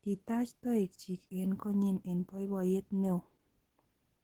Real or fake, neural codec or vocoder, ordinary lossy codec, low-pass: fake; codec, 44.1 kHz, 7.8 kbps, Pupu-Codec; Opus, 16 kbps; 19.8 kHz